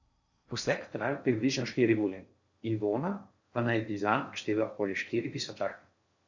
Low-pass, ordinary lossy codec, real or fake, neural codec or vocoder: 7.2 kHz; Opus, 64 kbps; fake; codec, 16 kHz in and 24 kHz out, 0.6 kbps, FocalCodec, streaming, 4096 codes